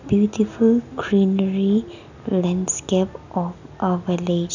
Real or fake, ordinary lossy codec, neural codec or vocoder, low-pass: real; none; none; 7.2 kHz